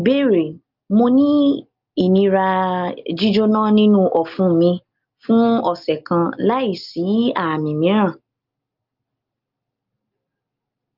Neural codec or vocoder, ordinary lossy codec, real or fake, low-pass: none; Opus, 24 kbps; real; 5.4 kHz